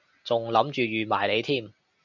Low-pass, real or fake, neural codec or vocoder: 7.2 kHz; real; none